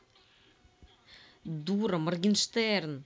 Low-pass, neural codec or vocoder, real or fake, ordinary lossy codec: none; none; real; none